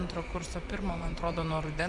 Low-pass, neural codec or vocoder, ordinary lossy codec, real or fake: 10.8 kHz; vocoder, 24 kHz, 100 mel bands, Vocos; Opus, 64 kbps; fake